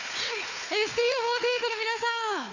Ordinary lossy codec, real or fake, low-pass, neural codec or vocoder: none; fake; 7.2 kHz; codec, 16 kHz, 4 kbps, FunCodec, trained on Chinese and English, 50 frames a second